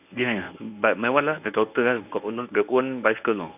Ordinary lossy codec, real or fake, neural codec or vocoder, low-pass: none; fake; codec, 24 kHz, 0.9 kbps, WavTokenizer, medium speech release version 1; 3.6 kHz